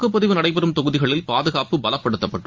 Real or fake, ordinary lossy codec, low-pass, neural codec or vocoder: real; Opus, 32 kbps; 7.2 kHz; none